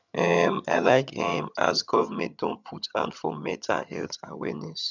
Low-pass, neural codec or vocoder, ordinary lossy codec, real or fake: 7.2 kHz; vocoder, 22.05 kHz, 80 mel bands, HiFi-GAN; none; fake